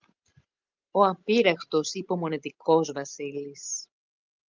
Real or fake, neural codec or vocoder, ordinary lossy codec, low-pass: real; none; Opus, 24 kbps; 7.2 kHz